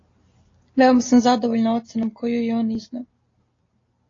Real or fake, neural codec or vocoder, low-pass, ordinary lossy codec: real; none; 7.2 kHz; AAC, 32 kbps